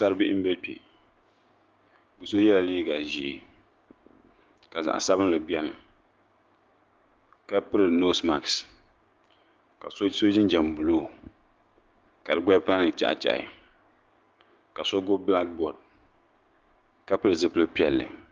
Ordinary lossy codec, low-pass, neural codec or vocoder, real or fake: Opus, 32 kbps; 7.2 kHz; codec, 16 kHz, 6 kbps, DAC; fake